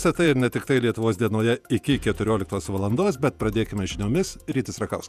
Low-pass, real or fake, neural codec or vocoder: 14.4 kHz; fake; vocoder, 48 kHz, 128 mel bands, Vocos